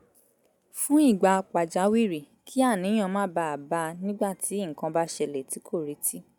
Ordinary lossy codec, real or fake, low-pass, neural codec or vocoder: none; real; 19.8 kHz; none